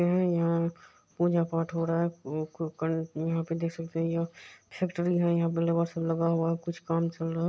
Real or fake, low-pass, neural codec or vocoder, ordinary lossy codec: real; none; none; none